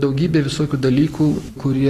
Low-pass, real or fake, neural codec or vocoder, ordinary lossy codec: 14.4 kHz; real; none; AAC, 48 kbps